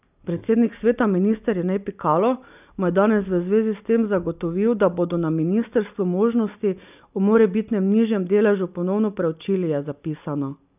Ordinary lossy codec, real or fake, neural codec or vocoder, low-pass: none; real; none; 3.6 kHz